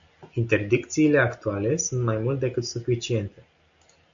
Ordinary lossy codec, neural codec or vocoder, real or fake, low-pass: AAC, 64 kbps; none; real; 7.2 kHz